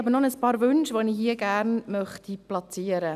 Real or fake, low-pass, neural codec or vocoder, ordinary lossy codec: real; 14.4 kHz; none; none